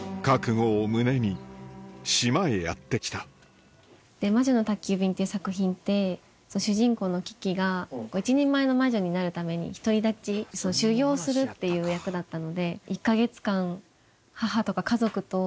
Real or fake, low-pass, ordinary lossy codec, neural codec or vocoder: real; none; none; none